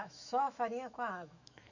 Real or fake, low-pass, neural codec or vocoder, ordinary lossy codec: fake; 7.2 kHz; autoencoder, 48 kHz, 128 numbers a frame, DAC-VAE, trained on Japanese speech; none